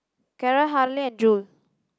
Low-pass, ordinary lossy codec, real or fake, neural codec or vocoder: none; none; real; none